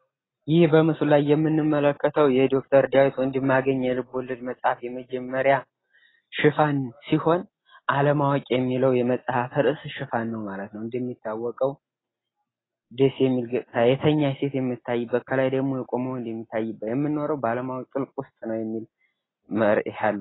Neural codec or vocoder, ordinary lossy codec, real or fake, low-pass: none; AAC, 16 kbps; real; 7.2 kHz